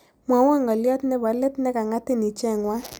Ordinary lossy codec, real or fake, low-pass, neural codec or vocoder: none; real; none; none